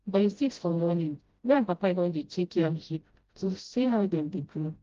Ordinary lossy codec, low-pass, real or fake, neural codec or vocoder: Opus, 32 kbps; 7.2 kHz; fake; codec, 16 kHz, 0.5 kbps, FreqCodec, smaller model